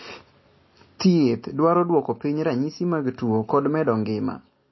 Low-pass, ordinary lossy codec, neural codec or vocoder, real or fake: 7.2 kHz; MP3, 24 kbps; none; real